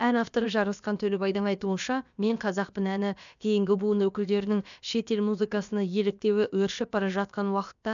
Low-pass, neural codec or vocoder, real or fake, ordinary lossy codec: 7.2 kHz; codec, 16 kHz, about 1 kbps, DyCAST, with the encoder's durations; fake; none